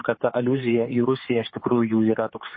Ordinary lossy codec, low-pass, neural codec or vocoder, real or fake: MP3, 24 kbps; 7.2 kHz; codec, 16 kHz, 4 kbps, X-Codec, HuBERT features, trained on general audio; fake